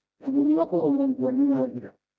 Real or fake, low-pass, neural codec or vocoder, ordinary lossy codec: fake; none; codec, 16 kHz, 0.5 kbps, FreqCodec, smaller model; none